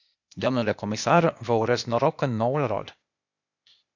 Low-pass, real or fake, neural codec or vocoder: 7.2 kHz; fake; codec, 16 kHz, 0.8 kbps, ZipCodec